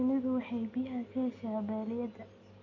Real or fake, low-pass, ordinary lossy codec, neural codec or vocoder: real; 7.2 kHz; none; none